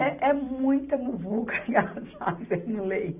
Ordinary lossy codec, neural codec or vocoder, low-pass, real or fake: none; none; 3.6 kHz; real